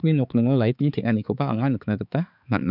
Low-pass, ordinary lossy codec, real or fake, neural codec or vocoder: 5.4 kHz; none; fake; codec, 16 kHz, 4 kbps, X-Codec, HuBERT features, trained on balanced general audio